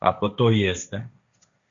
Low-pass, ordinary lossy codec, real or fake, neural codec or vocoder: 7.2 kHz; MP3, 96 kbps; fake; codec, 16 kHz, 1.1 kbps, Voila-Tokenizer